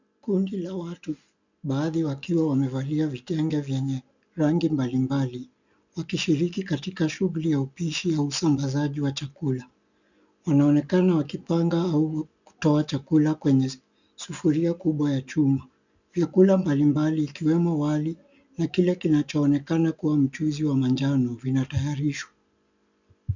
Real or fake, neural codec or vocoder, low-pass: real; none; 7.2 kHz